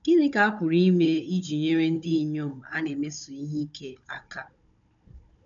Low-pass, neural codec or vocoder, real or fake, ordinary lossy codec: 7.2 kHz; codec, 16 kHz, 16 kbps, FunCodec, trained on Chinese and English, 50 frames a second; fake; none